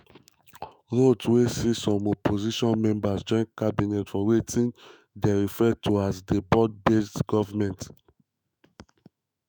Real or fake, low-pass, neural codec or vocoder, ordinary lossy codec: fake; none; autoencoder, 48 kHz, 128 numbers a frame, DAC-VAE, trained on Japanese speech; none